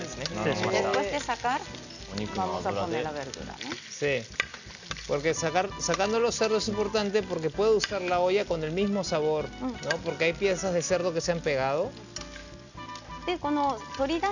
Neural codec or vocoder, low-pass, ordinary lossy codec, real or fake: none; 7.2 kHz; none; real